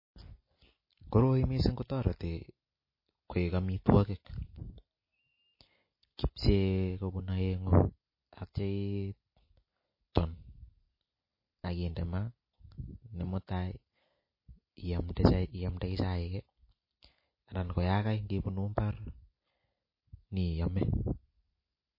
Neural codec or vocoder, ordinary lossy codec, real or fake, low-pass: none; MP3, 24 kbps; real; 5.4 kHz